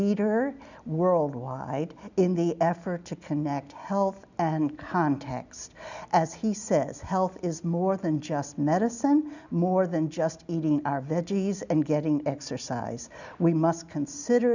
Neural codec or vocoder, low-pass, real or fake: none; 7.2 kHz; real